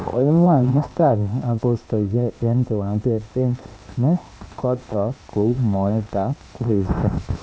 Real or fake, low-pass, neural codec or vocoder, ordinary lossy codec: fake; none; codec, 16 kHz, 0.8 kbps, ZipCodec; none